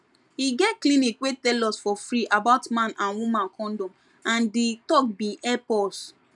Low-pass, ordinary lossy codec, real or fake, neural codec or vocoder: 10.8 kHz; none; real; none